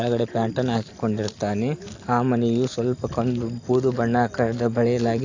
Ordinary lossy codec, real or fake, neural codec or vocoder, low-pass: AAC, 48 kbps; real; none; 7.2 kHz